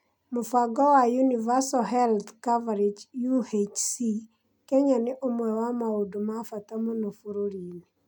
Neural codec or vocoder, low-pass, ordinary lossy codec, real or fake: none; 19.8 kHz; none; real